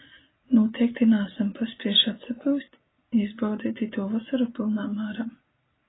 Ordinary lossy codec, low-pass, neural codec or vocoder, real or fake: AAC, 16 kbps; 7.2 kHz; none; real